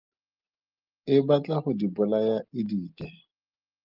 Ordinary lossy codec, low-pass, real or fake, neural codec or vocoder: Opus, 24 kbps; 5.4 kHz; real; none